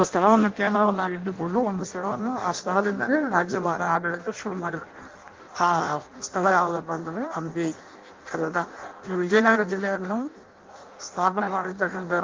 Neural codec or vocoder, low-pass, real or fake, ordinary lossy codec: codec, 16 kHz in and 24 kHz out, 0.6 kbps, FireRedTTS-2 codec; 7.2 kHz; fake; Opus, 32 kbps